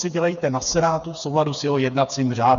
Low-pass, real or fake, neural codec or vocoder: 7.2 kHz; fake; codec, 16 kHz, 4 kbps, FreqCodec, smaller model